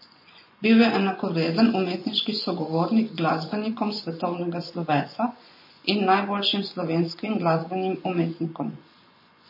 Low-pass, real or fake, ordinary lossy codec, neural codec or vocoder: 5.4 kHz; fake; MP3, 24 kbps; vocoder, 24 kHz, 100 mel bands, Vocos